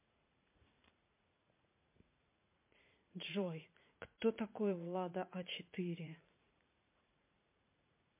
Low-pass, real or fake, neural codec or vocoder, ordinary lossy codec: 3.6 kHz; fake; vocoder, 44.1 kHz, 80 mel bands, Vocos; MP3, 24 kbps